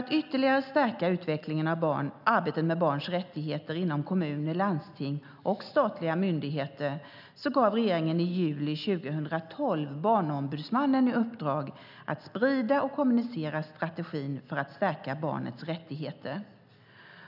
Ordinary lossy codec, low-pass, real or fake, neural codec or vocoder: none; 5.4 kHz; real; none